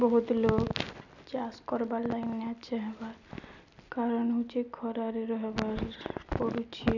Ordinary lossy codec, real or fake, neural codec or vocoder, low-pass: none; real; none; 7.2 kHz